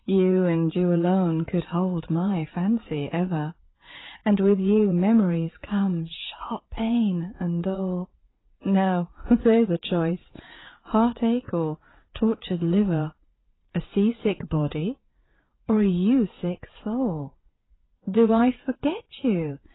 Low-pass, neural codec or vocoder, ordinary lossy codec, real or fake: 7.2 kHz; vocoder, 44.1 kHz, 80 mel bands, Vocos; AAC, 16 kbps; fake